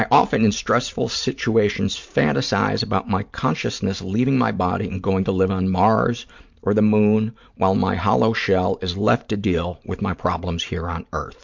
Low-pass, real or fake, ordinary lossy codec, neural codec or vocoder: 7.2 kHz; fake; MP3, 64 kbps; vocoder, 44.1 kHz, 128 mel bands every 512 samples, BigVGAN v2